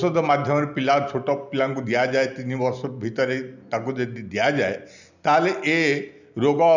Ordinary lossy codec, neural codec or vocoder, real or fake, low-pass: none; none; real; 7.2 kHz